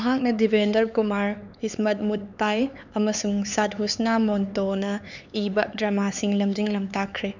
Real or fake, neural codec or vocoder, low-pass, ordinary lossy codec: fake; codec, 16 kHz, 4 kbps, X-Codec, HuBERT features, trained on LibriSpeech; 7.2 kHz; none